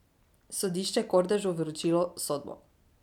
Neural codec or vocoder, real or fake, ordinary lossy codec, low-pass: none; real; none; 19.8 kHz